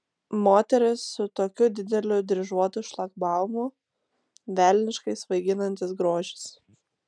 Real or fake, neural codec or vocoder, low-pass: real; none; 9.9 kHz